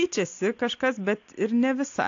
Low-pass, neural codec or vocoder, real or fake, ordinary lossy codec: 7.2 kHz; none; real; AAC, 48 kbps